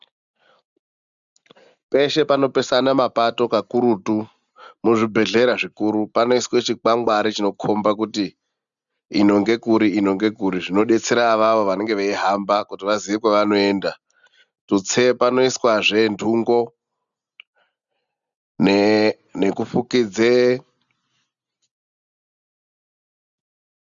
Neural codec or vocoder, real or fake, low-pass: none; real; 7.2 kHz